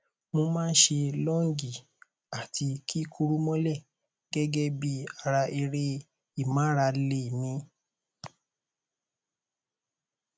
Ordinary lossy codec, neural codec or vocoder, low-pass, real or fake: none; none; none; real